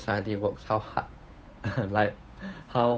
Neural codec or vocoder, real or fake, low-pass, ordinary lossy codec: codec, 16 kHz, 8 kbps, FunCodec, trained on Chinese and English, 25 frames a second; fake; none; none